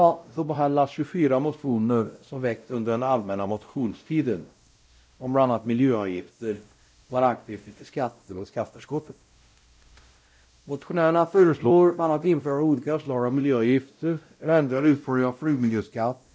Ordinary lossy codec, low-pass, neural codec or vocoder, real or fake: none; none; codec, 16 kHz, 0.5 kbps, X-Codec, WavLM features, trained on Multilingual LibriSpeech; fake